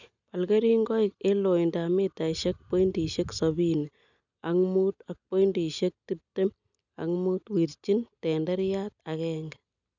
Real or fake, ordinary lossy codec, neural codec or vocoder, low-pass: real; none; none; 7.2 kHz